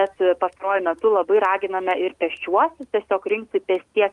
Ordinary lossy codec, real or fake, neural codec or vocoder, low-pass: Opus, 32 kbps; real; none; 10.8 kHz